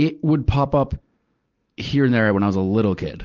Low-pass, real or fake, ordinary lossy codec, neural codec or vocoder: 7.2 kHz; real; Opus, 32 kbps; none